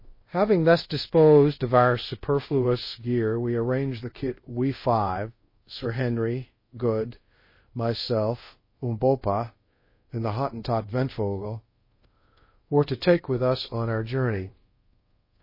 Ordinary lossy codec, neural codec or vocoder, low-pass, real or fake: MP3, 24 kbps; codec, 24 kHz, 0.5 kbps, DualCodec; 5.4 kHz; fake